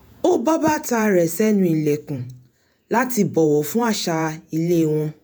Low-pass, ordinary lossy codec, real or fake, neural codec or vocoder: none; none; fake; vocoder, 48 kHz, 128 mel bands, Vocos